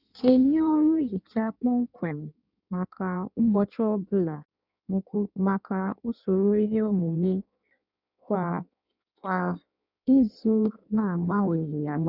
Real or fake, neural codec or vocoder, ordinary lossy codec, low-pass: fake; codec, 16 kHz in and 24 kHz out, 1.1 kbps, FireRedTTS-2 codec; none; 5.4 kHz